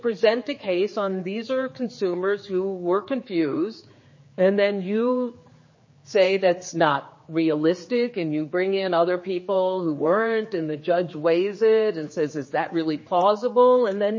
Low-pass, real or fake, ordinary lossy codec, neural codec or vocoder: 7.2 kHz; fake; MP3, 32 kbps; codec, 16 kHz, 4 kbps, X-Codec, HuBERT features, trained on general audio